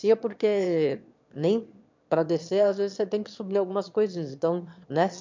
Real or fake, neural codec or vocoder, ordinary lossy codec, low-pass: fake; autoencoder, 22.05 kHz, a latent of 192 numbers a frame, VITS, trained on one speaker; MP3, 64 kbps; 7.2 kHz